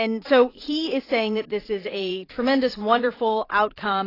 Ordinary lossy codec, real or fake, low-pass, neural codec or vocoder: AAC, 24 kbps; fake; 5.4 kHz; vocoder, 44.1 kHz, 80 mel bands, Vocos